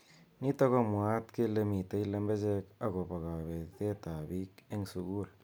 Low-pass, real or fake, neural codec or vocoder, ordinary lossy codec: none; real; none; none